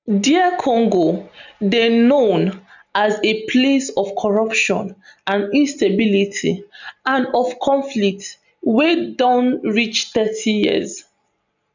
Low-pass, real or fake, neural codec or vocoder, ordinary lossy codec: 7.2 kHz; real; none; none